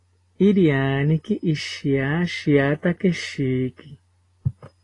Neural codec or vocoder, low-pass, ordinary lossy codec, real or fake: none; 10.8 kHz; AAC, 32 kbps; real